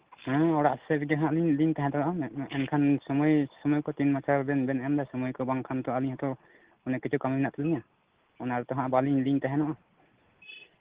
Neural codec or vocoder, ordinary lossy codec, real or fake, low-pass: none; Opus, 16 kbps; real; 3.6 kHz